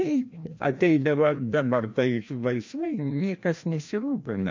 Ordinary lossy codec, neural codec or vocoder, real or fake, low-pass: MP3, 48 kbps; codec, 16 kHz, 1 kbps, FreqCodec, larger model; fake; 7.2 kHz